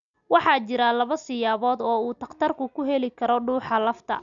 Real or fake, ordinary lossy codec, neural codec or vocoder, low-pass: real; none; none; 7.2 kHz